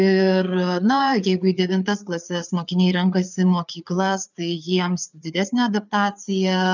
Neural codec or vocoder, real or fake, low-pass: codec, 16 kHz, 4 kbps, FreqCodec, larger model; fake; 7.2 kHz